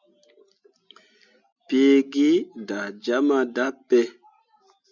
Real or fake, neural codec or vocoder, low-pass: real; none; 7.2 kHz